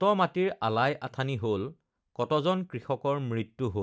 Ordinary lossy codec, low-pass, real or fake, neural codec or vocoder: none; none; real; none